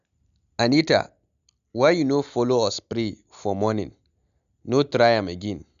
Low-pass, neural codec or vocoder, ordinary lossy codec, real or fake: 7.2 kHz; none; none; real